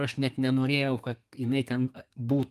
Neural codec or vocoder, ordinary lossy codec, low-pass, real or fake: codec, 32 kHz, 1.9 kbps, SNAC; Opus, 32 kbps; 14.4 kHz; fake